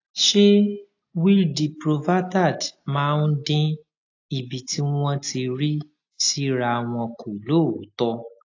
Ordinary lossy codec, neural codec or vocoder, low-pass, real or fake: AAC, 48 kbps; none; 7.2 kHz; real